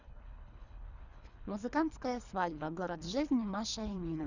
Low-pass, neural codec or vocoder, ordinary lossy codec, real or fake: 7.2 kHz; codec, 24 kHz, 3 kbps, HILCodec; none; fake